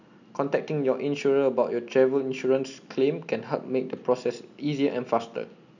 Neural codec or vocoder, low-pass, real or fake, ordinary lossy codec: none; 7.2 kHz; real; none